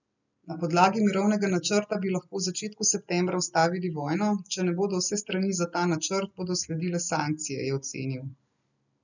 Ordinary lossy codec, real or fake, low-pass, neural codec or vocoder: none; real; 7.2 kHz; none